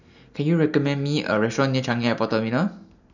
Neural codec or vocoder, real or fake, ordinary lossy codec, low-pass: none; real; none; 7.2 kHz